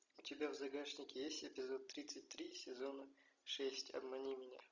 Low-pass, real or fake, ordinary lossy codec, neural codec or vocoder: 7.2 kHz; real; Opus, 64 kbps; none